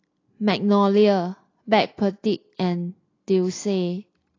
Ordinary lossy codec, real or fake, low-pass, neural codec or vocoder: AAC, 32 kbps; real; 7.2 kHz; none